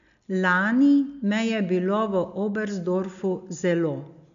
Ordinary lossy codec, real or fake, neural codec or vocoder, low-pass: none; real; none; 7.2 kHz